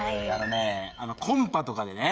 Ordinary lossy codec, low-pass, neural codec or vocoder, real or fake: none; none; codec, 16 kHz, 16 kbps, FreqCodec, smaller model; fake